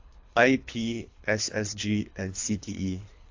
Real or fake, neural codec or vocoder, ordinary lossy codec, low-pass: fake; codec, 24 kHz, 3 kbps, HILCodec; AAC, 48 kbps; 7.2 kHz